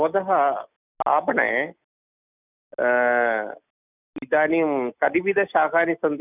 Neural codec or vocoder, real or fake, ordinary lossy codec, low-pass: none; real; none; 3.6 kHz